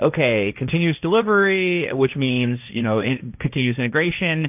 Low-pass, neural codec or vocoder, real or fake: 3.6 kHz; codec, 16 kHz, 1.1 kbps, Voila-Tokenizer; fake